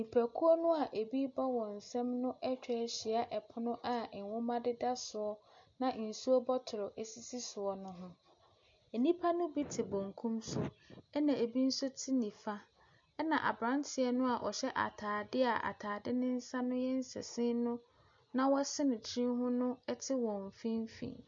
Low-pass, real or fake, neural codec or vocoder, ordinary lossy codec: 7.2 kHz; real; none; MP3, 48 kbps